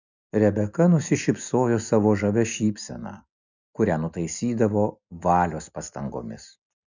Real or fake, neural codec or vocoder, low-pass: real; none; 7.2 kHz